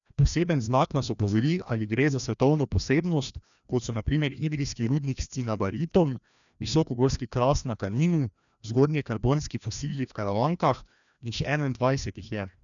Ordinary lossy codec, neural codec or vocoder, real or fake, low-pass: none; codec, 16 kHz, 1 kbps, FreqCodec, larger model; fake; 7.2 kHz